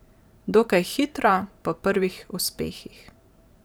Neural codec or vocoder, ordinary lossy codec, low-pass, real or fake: vocoder, 44.1 kHz, 128 mel bands every 512 samples, BigVGAN v2; none; none; fake